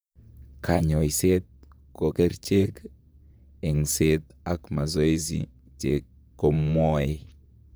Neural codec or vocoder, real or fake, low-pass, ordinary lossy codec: vocoder, 44.1 kHz, 128 mel bands, Pupu-Vocoder; fake; none; none